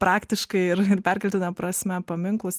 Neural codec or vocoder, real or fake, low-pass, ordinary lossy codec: none; real; 14.4 kHz; Opus, 24 kbps